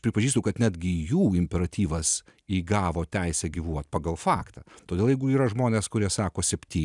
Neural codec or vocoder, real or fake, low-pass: none; real; 10.8 kHz